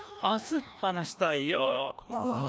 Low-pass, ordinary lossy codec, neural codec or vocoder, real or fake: none; none; codec, 16 kHz, 1 kbps, FreqCodec, larger model; fake